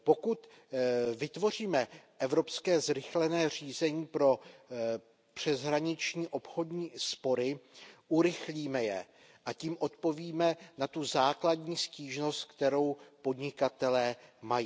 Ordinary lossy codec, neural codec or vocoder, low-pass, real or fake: none; none; none; real